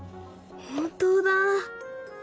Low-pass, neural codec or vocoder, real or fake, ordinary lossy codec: none; none; real; none